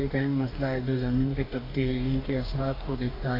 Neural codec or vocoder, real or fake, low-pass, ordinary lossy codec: codec, 44.1 kHz, 2.6 kbps, DAC; fake; 5.4 kHz; AAC, 32 kbps